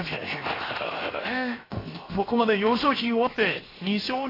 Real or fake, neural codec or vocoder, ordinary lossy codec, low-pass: fake; codec, 16 kHz, 0.7 kbps, FocalCodec; AAC, 24 kbps; 5.4 kHz